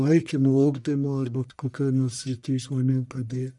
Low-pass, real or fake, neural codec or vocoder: 10.8 kHz; fake; codec, 44.1 kHz, 1.7 kbps, Pupu-Codec